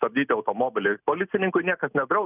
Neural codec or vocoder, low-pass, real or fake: none; 3.6 kHz; real